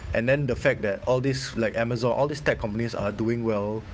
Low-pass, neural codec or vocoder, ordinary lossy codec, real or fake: none; codec, 16 kHz, 8 kbps, FunCodec, trained on Chinese and English, 25 frames a second; none; fake